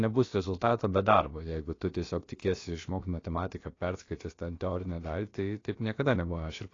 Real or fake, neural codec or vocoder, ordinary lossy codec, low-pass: fake; codec, 16 kHz, about 1 kbps, DyCAST, with the encoder's durations; AAC, 32 kbps; 7.2 kHz